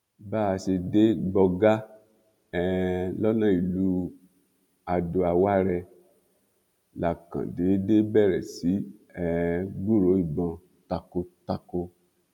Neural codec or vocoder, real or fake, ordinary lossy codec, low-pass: none; real; none; 19.8 kHz